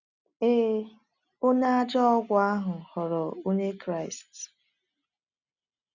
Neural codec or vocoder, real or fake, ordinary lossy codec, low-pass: none; real; none; 7.2 kHz